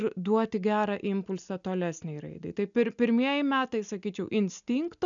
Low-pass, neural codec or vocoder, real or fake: 7.2 kHz; none; real